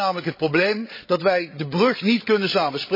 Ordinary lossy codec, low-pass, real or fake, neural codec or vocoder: MP3, 24 kbps; 5.4 kHz; fake; codec, 16 kHz, 16 kbps, FreqCodec, larger model